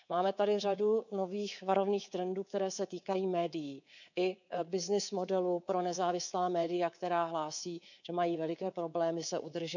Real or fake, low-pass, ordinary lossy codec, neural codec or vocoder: fake; 7.2 kHz; none; codec, 24 kHz, 3.1 kbps, DualCodec